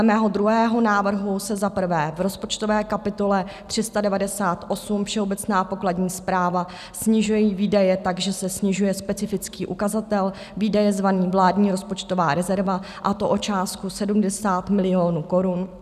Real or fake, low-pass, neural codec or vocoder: real; 14.4 kHz; none